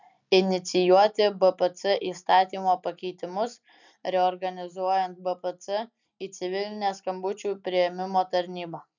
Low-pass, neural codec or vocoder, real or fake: 7.2 kHz; none; real